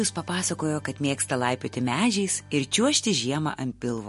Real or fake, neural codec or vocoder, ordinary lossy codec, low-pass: real; none; MP3, 48 kbps; 14.4 kHz